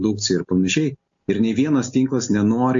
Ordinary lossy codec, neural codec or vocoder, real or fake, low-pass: MP3, 48 kbps; none; real; 7.2 kHz